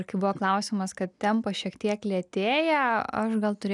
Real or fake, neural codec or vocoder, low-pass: real; none; 10.8 kHz